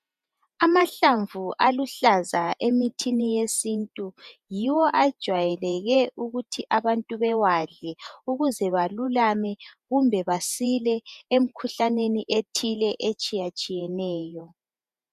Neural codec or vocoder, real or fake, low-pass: vocoder, 48 kHz, 128 mel bands, Vocos; fake; 14.4 kHz